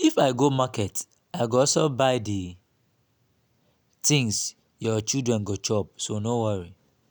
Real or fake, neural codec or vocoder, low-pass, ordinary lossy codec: real; none; none; none